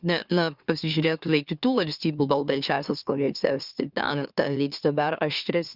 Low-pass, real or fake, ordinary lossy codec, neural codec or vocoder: 5.4 kHz; fake; Opus, 64 kbps; autoencoder, 44.1 kHz, a latent of 192 numbers a frame, MeloTTS